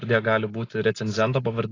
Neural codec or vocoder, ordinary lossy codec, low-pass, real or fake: none; AAC, 32 kbps; 7.2 kHz; real